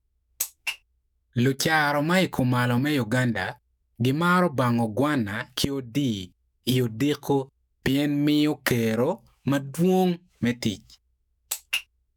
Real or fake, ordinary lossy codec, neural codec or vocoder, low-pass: fake; none; autoencoder, 48 kHz, 128 numbers a frame, DAC-VAE, trained on Japanese speech; none